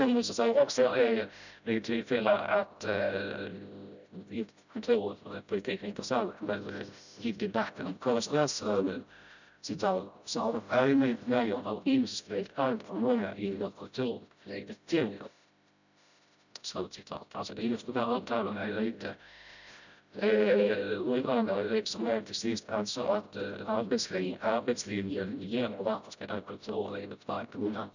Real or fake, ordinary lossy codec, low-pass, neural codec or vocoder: fake; none; 7.2 kHz; codec, 16 kHz, 0.5 kbps, FreqCodec, smaller model